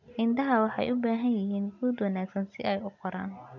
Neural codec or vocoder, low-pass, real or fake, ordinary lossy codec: none; 7.2 kHz; real; none